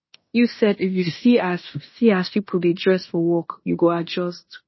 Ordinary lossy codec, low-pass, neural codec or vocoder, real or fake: MP3, 24 kbps; 7.2 kHz; codec, 16 kHz in and 24 kHz out, 0.9 kbps, LongCat-Audio-Codec, fine tuned four codebook decoder; fake